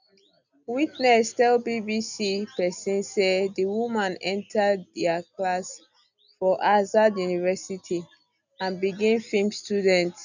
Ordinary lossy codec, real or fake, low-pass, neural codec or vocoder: none; real; 7.2 kHz; none